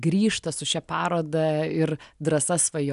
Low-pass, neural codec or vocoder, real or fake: 10.8 kHz; none; real